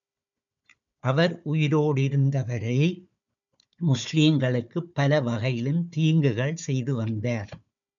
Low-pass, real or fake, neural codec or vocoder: 7.2 kHz; fake; codec, 16 kHz, 4 kbps, FunCodec, trained on Chinese and English, 50 frames a second